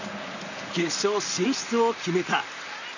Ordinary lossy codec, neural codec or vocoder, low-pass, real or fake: none; vocoder, 44.1 kHz, 128 mel bands, Pupu-Vocoder; 7.2 kHz; fake